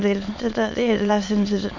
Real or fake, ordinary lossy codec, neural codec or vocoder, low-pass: fake; Opus, 64 kbps; autoencoder, 22.05 kHz, a latent of 192 numbers a frame, VITS, trained on many speakers; 7.2 kHz